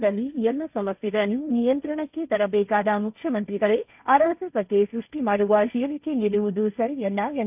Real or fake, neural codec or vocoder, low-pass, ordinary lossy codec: fake; codec, 16 kHz, 1.1 kbps, Voila-Tokenizer; 3.6 kHz; none